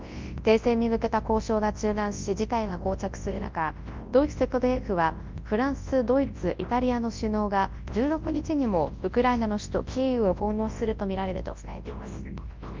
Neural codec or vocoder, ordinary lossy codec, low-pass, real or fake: codec, 24 kHz, 0.9 kbps, WavTokenizer, large speech release; Opus, 24 kbps; 7.2 kHz; fake